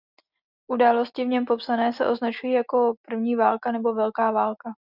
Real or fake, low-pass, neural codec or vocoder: real; 5.4 kHz; none